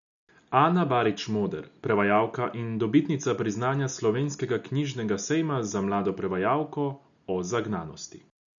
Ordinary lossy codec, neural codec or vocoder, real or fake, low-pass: none; none; real; 7.2 kHz